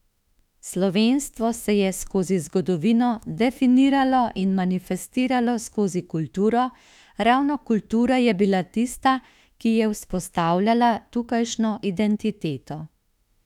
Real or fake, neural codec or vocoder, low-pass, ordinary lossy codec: fake; autoencoder, 48 kHz, 32 numbers a frame, DAC-VAE, trained on Japanese speech; 19.8 kHz; none